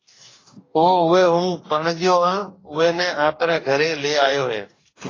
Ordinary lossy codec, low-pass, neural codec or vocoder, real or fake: AAC, 32 kbps; 7.2 kHz; codec, 44.1 kHz, 2.6 kbps, DAC; fake